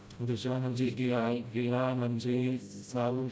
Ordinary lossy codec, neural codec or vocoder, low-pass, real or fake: none; codec, 16 kHz, 0.5 kbps, FreqCodec, smaller model; none; fake